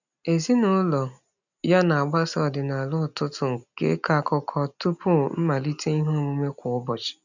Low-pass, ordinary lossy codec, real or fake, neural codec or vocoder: 7.2 kHz; none; real; none